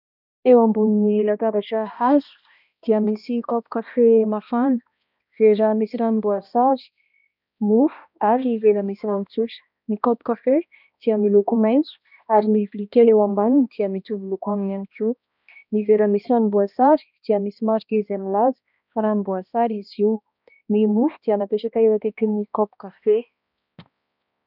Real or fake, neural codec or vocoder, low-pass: fake; codec, 16 kHz, 1 kbps, X-Codec, HuBERT features, trained on balanced general audio; 5.4 kHz